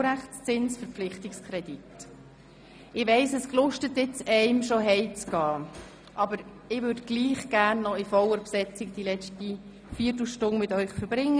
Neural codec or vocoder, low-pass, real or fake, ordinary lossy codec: none; none; real; none